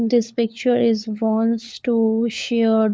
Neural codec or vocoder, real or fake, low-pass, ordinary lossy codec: codec, 16 kHz, 4 kbps, FunCodec, trained on LibriTTS, 50 frames a second; fake; none; none